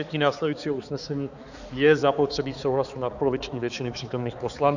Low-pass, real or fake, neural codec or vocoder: 7.2 kHz; fake; codec, 16 kHz, 4 kbps, X-Codec, HuBERT features, trained on balanced general audio